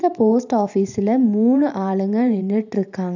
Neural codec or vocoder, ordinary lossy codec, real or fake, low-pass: vocoder, 44.1 kHz, 128 mel bands every 512 samples, BigVGAN v2; none; fake; 7.2 kHz